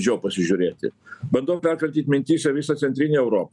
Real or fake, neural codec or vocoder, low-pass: real; none; 10.8 kHz